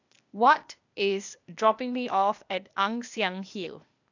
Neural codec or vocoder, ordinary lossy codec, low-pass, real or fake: codec, 16 kHz, 0.8 kbps, ZipCodec; none; 7.2 kHz; fake